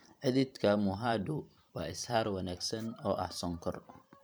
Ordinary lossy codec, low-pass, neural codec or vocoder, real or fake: none; none; vocoder, 44.1 kHz, 128 mel bands every 512 samples, BigVGAN v2; fake